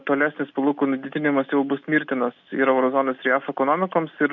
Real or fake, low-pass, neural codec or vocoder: real; 7.2 kHz; none